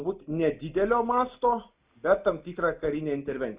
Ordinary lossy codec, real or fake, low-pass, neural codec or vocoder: Opus, 64 kbps; real; 3.6 kHz; none